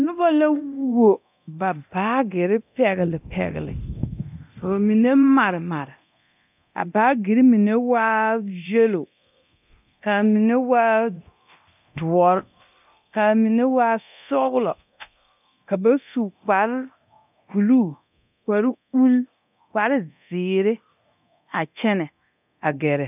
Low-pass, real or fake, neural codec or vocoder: 3.6 kHz; fake; codec, 24 kHz, 0.9 kbps, DualCodec